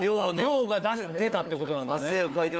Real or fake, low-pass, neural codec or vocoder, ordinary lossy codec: fake; none; codec, 16 kHz, 4 kbps, FunCodec, trained on LibriTTS, 50 frames a second; none